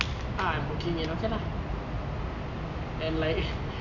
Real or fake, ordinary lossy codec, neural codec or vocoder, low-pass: real; none; none; 7.2 kHz